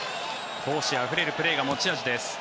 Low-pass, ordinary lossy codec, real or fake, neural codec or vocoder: none; none; real; none